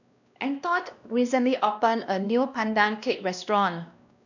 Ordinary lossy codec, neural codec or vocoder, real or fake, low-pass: none; codec, 16 kHz, 1 kbps, X-Codec, WavLM features, trained on Multilingual LibriSpeech; fake; 7.2 kHz